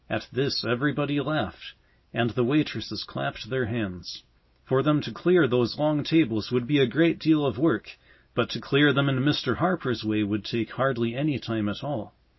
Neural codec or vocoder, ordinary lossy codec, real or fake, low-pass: none; MP3, 24 kbps; real; 7.2 kHz